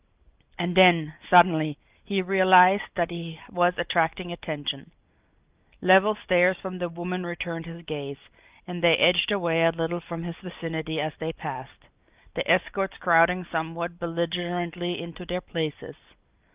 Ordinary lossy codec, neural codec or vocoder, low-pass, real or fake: Opus, 32 kbps; none; 3.6 kHz; real